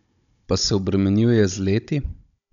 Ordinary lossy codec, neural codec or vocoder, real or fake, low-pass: none; codec, 16 kHz, 16 kbps, FunCodec, trained on Chinese and English, 50 frames a second; fake; 7.2 kHz